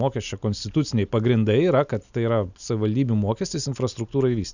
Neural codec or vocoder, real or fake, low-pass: none; real; 7.2 kHz